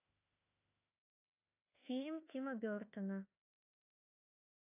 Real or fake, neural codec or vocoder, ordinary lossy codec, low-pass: fake; autoencoder, 48 kHz, 32 numbers a frame, DAC-VAE, trained on Japanese speech; AAC, 24 kbps; 3.6 kHz